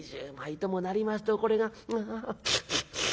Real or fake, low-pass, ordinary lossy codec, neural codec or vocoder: real; none; none; none